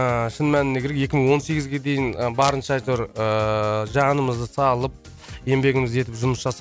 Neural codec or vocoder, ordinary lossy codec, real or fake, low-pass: none; none; real; none